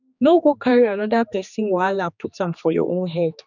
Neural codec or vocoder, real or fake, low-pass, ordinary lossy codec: codec, 16 kHz, 2 kbps, X-Codec, HuBERT features, trained on general audio; fake; 7.2 kHz; none